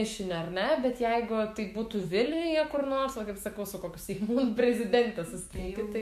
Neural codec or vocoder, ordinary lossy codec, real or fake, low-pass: autoencoder, 48 kHz, 128 numbers a frame, DAC-VAE, trained on Japanese speech; MP3, 64 kbps; fake; 14.4 kHz